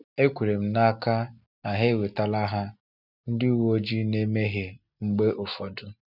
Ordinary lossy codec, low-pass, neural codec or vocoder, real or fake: none; 5.4 kHz; none; real